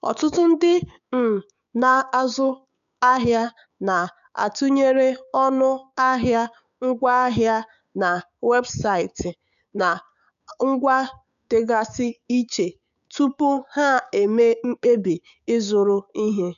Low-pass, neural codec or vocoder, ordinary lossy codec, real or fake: 7.2 kHz; codec, 16 kHz, 6 kbps, DAC; none; fake